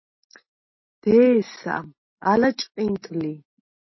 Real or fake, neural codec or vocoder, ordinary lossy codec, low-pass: real; none; MP3, 24 kbps; 7.2 kHz